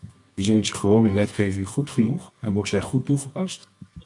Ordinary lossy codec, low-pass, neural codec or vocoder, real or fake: MP3, 64 kbps; 10.8 kHz; codec, 24 kHz, 0.9 kbps, WavTokenizer, medium music audio release; fake